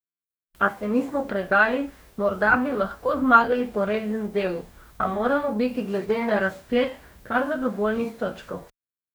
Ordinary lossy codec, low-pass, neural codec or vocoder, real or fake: none; none; codec, 44.1 kHz, 2.6 kbps, DAC; fake